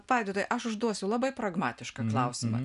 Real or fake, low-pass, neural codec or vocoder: real; 10.8 kHz; none